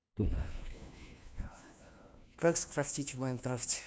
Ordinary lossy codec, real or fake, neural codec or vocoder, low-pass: none; fake; codec, 16 kHz, 1 kbps, FunCodec, trained on LibriTTS, 50 frames a second; none